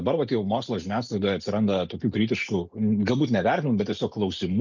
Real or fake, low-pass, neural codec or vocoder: real; 7.2 kHz; none